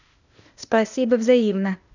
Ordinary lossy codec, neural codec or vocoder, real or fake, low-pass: none; codec, 16 kHz, 0.8 kbps, ZipCodec; fake; 7.2 kHz